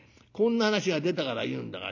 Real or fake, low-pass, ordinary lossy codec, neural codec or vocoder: real; 7.2 kHz; none; none